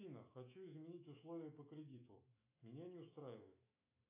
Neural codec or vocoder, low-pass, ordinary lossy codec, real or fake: autoencoder, 48 kHz, 128 numbers a frame, DAC-VAE, trained on Japanese speech; 3.6 kHz; AAC, 24 kbps; fake